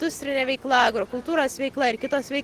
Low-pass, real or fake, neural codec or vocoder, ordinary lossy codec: 14.4 kHz; real; none; Opus, 16 kbps